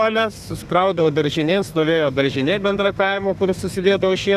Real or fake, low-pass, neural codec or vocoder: fake; 14.4 kHz; codec, 32 kHz, 1.9 kbps, SNAC